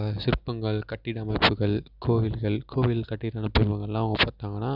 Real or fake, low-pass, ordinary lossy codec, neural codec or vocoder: real; 5.4 kHz; none; none